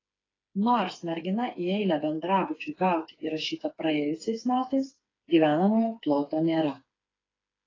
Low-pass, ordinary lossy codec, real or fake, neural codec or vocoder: 7.2 kHz; AAC, 32 kbps; fake; codec, 16 kHz, 4 kbps, FreqCodec, smaller model